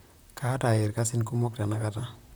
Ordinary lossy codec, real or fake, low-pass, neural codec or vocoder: none; fake; none; vocoder, 44.1 kHz, 128 mel bands, Pupu-Vocoder